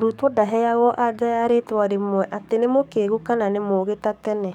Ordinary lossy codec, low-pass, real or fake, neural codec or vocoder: none; 19.8 kHz; fake; codec, 44.1 kHz, 7.8 kbps, DAC